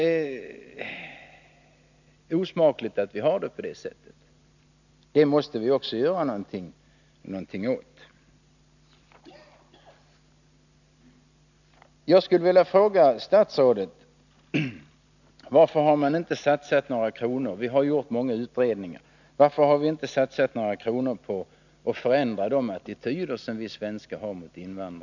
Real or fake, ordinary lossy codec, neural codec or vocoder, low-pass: real; none; none; 7.2 kHz